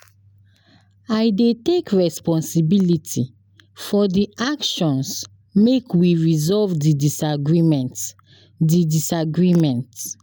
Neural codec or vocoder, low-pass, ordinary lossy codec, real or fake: none; none; none; real